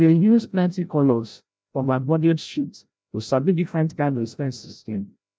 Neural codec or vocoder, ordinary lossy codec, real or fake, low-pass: codec, 16 kHz, 0.5 kbps, FreqCodec, larger model; none; fake; none